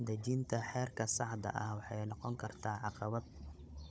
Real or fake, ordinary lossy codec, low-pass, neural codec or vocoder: fake; none; none; codec, 16 kHz, 16 kbps, FunCodec, trained on Chinese and English, 50 frames a second